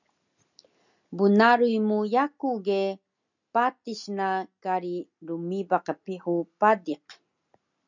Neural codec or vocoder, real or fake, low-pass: none; real; 7.2 kHz